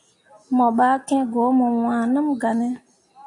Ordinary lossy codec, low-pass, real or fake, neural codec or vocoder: AAC, 48 kbps; 10.8 kHz; real; none